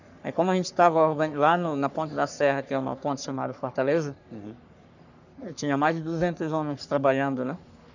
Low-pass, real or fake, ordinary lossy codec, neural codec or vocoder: 7.2 kHz; fake; none; codec, 44.1 kHz, 3.4 kbps, Pupu-Codec